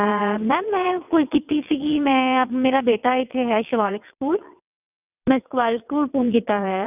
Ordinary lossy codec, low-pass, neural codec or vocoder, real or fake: none; 3.6 kHz; vocoder, 22.05 kHz, 80 mel bands, WaveNeXt; fake